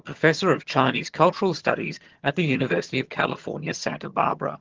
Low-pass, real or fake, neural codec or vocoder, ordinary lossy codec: 7.2 kHz; fake; vocoder, 22.05 kHz, 80 mel bands, HiFi-GAN; Opus, 16 kbps